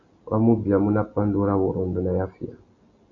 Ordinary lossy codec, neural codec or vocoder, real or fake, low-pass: MP3, 64 kbps; none; real; 7.2 kHz